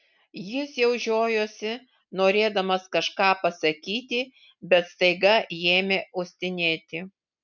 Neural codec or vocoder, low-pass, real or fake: none; 7.2 kHz; real